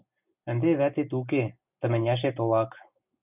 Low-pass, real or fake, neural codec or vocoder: 3.6 kHz; real; none